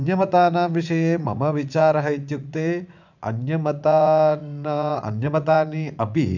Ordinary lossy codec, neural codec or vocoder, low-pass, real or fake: none; vocoder, 44.1 kHz, 80 mel bands, Vocos; 7.2 kHz; fake